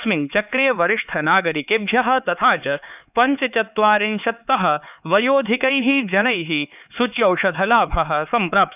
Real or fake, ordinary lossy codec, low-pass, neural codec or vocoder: fake; none; 3.6 kHz; codec, 16 kHz, 4 kbps, X-Codec, HuBERT features, trained on LibriSpeech